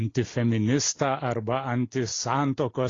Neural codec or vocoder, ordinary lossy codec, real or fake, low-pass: none; AAC, 32 kbps; real; 7.2 kHz